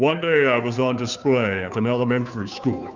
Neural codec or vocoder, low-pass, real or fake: codec, 16 kHz, 2 kbps, FunCodec, trained on Chinese and English, 25 frames a second; 7.2 kHz; fake